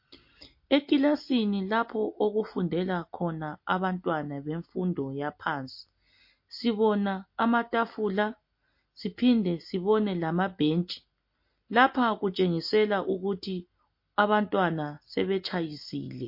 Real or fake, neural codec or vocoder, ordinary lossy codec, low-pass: real; none; MP3, 32 kbps; 5.4 kHz